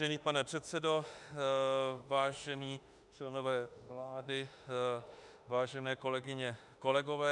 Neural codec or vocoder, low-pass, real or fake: autoencoder, 48 kHz, 32 numbers a frame, DAC-VAE, trained on Japanese speech; 10.8 kHz; fake